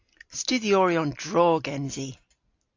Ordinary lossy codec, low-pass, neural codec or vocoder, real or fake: AAC, 48 kbps; 7.2 kHz; none; real